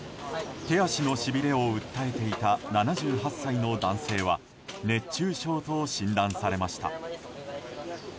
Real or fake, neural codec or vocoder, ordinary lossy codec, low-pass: real; none; none; none